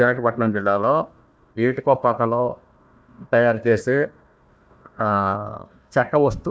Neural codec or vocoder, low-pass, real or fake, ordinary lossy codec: codec, 16 kHz, 1 kbps, FunCodec, trained on Chinese and English, 50 frames a second; none; fake; none